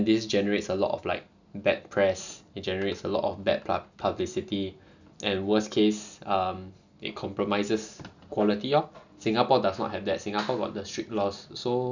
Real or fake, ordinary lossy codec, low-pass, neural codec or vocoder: real; none; 7.2 kHz; none